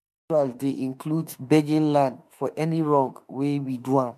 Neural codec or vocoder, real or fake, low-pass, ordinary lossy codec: autoencoder, 48 kHz, 32 numbers a frame, DAC-VAE, trained on Japanese speech; fake; 14.4 kHz; MP3, 96 kbps